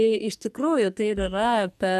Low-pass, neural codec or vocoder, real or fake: 14.4 kHz; codec, 44.1 kHz, 2.6 kbps, SNAC; fake